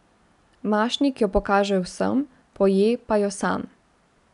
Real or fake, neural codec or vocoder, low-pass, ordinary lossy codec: real; none; 10.8 kHz; none